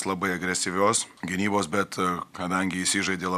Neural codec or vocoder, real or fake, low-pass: none; real; 14.4 kHz